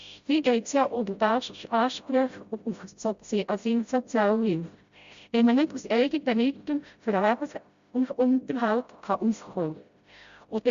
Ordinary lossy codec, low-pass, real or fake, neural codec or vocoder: Opus, 64 kbps; 7.2 kHz; fake; codec, 16 kHz, 0.5 kbps, FreqCodec, smaller model